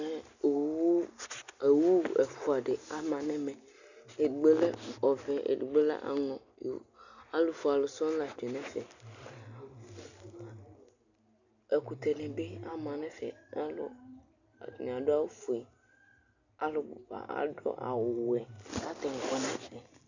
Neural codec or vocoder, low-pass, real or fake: none; 7.2 kHz; real